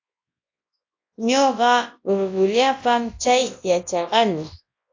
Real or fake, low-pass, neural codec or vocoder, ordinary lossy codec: fake; 7.2 kHz; codec, 24 kHz, 0.9 kbps, WavTokenizer, large speech release; AAC, 48 kbps